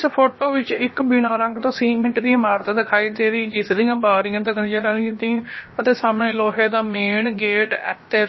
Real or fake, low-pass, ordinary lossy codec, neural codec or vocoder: fake; 7.2 kHz; MP3, 24 kbps; codec, 16 kHz, about 1 kbps, DyCAST, with the encoder's durations